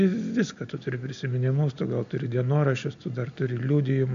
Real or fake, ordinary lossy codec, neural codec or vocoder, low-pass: real; AAC, 64 kbps; none; 7.2 kHz